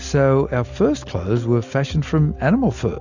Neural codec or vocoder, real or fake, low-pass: none; real; 7.2 kHz